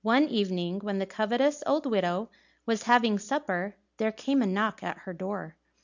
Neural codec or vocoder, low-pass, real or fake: none; 7.2 kHz; real